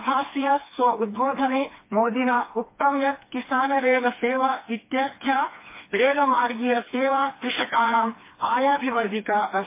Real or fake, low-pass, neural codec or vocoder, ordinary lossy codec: fake; 3.6 kHz; codec, 16 kHz, 2 kbps, FreqCodec, smaller model; MP3, 24 kbps